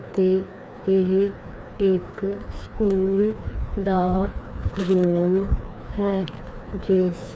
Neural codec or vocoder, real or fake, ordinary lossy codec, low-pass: codec, 16 kHz, 2 kbps, FreqCodec, larger model; fake; none; none